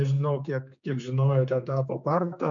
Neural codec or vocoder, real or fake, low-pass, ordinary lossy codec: codec, 16 kHz, 4 kbps, X-Codec, HuBERT features, trained on general audio; fake; 7.2 kHz; MP3, 64 kbps